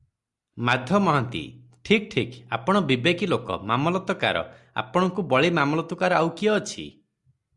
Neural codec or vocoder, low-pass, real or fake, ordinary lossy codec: none; 10.8 kHz; real; Opus, 64 kbps